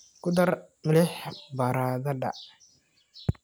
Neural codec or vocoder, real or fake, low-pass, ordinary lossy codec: none; real; none; none